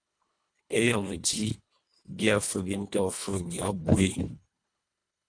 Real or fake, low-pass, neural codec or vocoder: fake; 9.9 kHz; codec, 24 kHz, 1.5 kbps, HILCodec